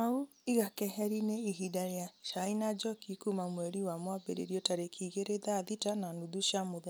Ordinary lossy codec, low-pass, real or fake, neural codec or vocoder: none; none; real; none